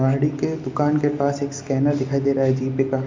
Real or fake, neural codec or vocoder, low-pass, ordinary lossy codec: real; none; 7.2 kHz; MP3, 64 kbps